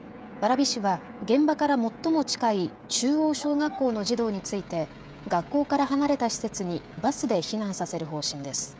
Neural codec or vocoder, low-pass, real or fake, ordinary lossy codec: codec, 16 kHz, 16 kbps, FreqCodec, smaller model; none; fake; none